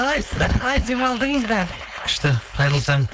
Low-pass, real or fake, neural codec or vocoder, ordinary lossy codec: none; fake; codec, 16 kHz, 4.8 kbps, FACodec; none